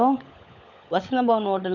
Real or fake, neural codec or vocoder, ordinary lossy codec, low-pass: fake; codec, 16 kHz, 16 kbps, FunCodec, trained on LibriTTS, 50 frames a second; none; 7.2 kHz